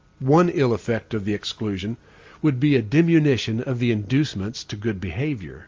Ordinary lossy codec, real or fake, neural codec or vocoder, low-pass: Opus, 32 kbps; real; none; 7.2 kHz